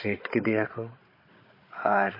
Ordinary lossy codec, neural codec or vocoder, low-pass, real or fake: MP3, 24 kbps; codec, 16 kHz, 16 kbps, FunCodec, trained on Chinese and English, 50 frames a second; 5.4 kHz; fake